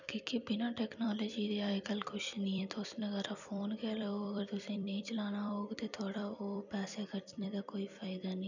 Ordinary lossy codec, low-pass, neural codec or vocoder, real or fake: none; 7.2 kHz; vocoder, 44.1 kHz, 128 mel bands every 256 samples, BigVGAN v2; fake